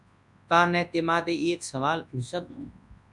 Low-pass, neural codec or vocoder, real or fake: 10.8 kHz; codec, 24 kHz, 0.9 kbps, WavTokenizer, large speech release; fake